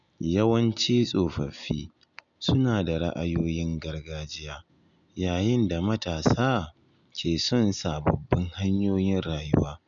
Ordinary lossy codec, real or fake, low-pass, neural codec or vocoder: none; real; 7.2 kHz; none